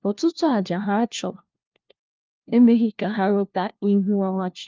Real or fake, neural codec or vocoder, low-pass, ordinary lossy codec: fake; codec, 16 kHz, 1 kbps, FunCodec, trained on LibriTTS, 50 frames a second; 7.2 kHz; Opus, 24 kbps